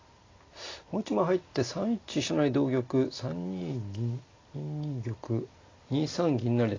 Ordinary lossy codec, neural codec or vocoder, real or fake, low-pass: AAC, 32 kbps; none; real; 7.2 kHz